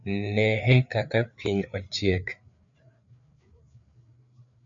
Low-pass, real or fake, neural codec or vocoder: 7.2 kHz; fake; codec, 16 kHz, 4 kbps, FreqCodec, larger model